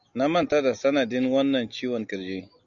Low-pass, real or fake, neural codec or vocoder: 7.2 kHz; real; none